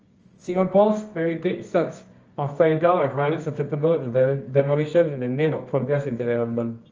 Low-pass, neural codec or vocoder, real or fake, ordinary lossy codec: 7.2 kHz; codec, 24 kHz, 0.9 kbps, WavTokenizer, medium music audio release; fake; Opus, 24 kbps